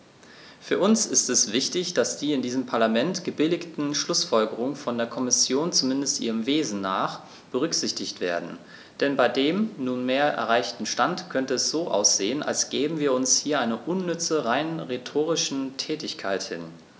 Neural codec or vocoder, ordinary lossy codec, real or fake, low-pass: none; none; real; none